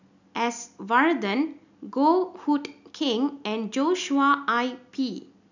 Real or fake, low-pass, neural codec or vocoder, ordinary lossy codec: real; 7.2 kHz; none; none